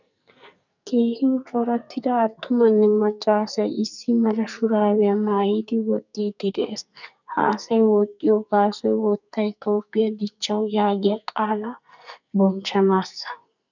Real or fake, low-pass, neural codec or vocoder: fake; 7.2 kHz; codec, 44.1 kHz, 2.6 kbps, SNAC